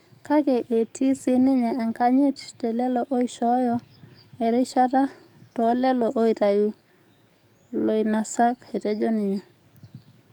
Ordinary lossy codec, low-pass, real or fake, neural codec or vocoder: none; 19.8 kHz; fake; codec, 44.1 kHz, 7.8 kbps, DAC